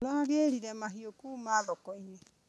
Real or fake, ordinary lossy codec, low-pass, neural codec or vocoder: real; none; none; none